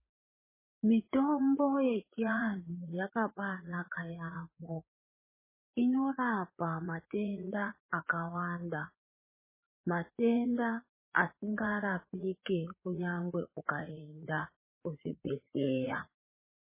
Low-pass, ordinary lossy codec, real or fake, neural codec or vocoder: 3.6 kHz; MP3, 16 kbps; fake; vocoder, 44.1 kHz, 80 mel bands, Vocos